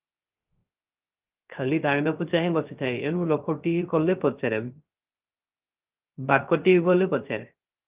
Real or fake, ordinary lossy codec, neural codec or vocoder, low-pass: fake; Opus, 32 kbps; codec, 16 kHz, 0.3 kbps, FocalCodec; 3.6 kHz